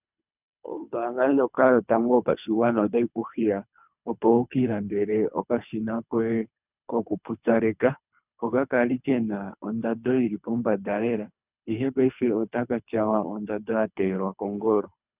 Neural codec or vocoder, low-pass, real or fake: codec, 24 kHz, 3 kbps, HILCodec; 3.6 kHz; fake